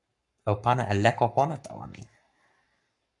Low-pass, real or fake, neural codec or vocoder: 10.8 kHz; fake; codec, 44.1 kHz, 7.8 kbps, Pupu-Codec